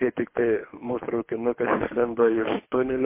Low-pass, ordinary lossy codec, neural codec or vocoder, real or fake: 3.6 kHz; MP3, 24 kbps; codec, 24 kHz, 3 kbps, HILCodec; fake